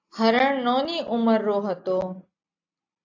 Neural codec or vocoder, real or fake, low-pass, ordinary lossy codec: none; real; 7.2 kHz; AAC, 48 kbps